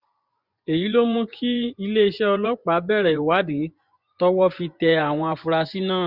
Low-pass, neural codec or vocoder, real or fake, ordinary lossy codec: 5.4 kHz; vocoder, 24 kHz, 100 mel bands, Vocos; fake; Opus, 64 kbps